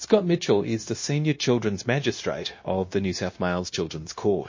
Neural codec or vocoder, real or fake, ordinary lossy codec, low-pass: codec, 16 kHz, 0.9 kbps, LongCat-Audio-Codec; fake; MP3, 32 kbps; 7.2 kHz